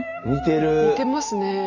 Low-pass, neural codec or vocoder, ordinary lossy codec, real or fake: 7.2 kHz; none; none; real